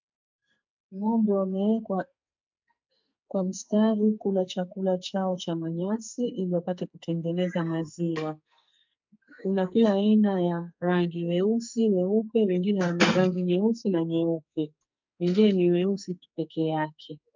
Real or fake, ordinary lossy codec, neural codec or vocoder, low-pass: fake; MP3, 64 kbps; codec, 44.1 kHz, 2.6 kbps, SNAC; 7.2 kHz